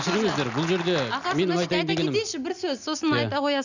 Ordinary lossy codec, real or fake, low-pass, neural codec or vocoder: none; real; 7.2 kHz; none